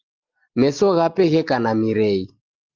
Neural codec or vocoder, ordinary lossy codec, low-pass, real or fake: none; Opus, 32 kbps; 7.2 kHz; real